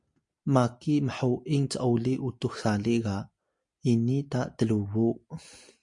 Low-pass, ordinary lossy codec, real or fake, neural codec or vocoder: 10.8 kHz; MP3, 64 kbps; real; none